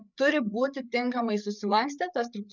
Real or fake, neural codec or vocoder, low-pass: fake; codec, 16 kHz, 8 kbps, FreqCodec, larger model; 7.2 kHz